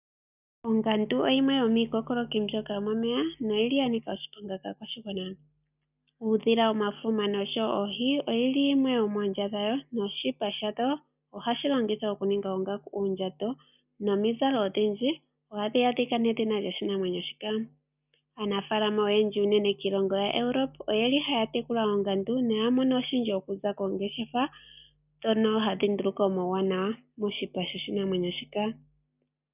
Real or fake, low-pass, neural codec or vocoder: real; 3.6 kHz; none